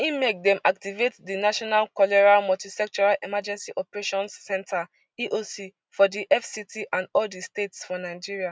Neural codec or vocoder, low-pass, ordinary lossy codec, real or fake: none; none; none; real